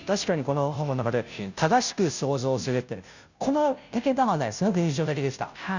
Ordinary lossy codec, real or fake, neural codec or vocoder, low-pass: none; fake; codec, 16 kHz, 0.5 kbps, FunCodec, trained on Chinese and English, 25 frames a second; 7.2 kHz